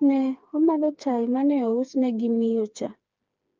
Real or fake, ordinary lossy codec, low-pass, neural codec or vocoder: fake; Opus, 24 kbps; 7.2 kHz; codec, 16 kHz, 4 kbps, FreqCodec, smaller model